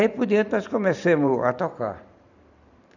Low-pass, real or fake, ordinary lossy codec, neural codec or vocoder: 7.2 kHz; fake; none; vocoder, 44.1 kHz, 128 mel bands every 512 samples, BigVGAN v2